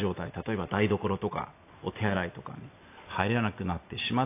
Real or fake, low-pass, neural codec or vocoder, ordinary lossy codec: real; 3.6 kHz; none; AAC, 24 kbps